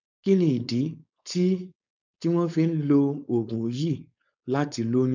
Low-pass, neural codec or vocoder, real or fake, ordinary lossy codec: 7.2 kHz; codec, 16 kHz, 4.8 kbps, FACodec; fake; none